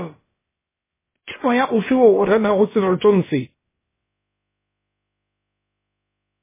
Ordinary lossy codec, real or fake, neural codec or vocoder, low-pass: MP3, 16 kbps; fake; codec, 16 kHz, about 1 kbps, DyCAST, with the encoder's durations; 3.6 kHz